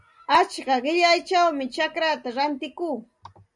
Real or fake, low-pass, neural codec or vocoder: real; 10.8 kHz; none